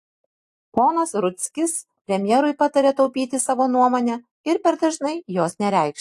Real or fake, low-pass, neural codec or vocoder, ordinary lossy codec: real; 14.4 kHz; none; AAC, 64 kbps